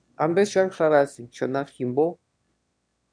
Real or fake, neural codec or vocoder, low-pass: fake; autoencoder, 22.05 kHz, a latent of 192 numbers a frame, VITS, trained on one speaker; 9.9 kHz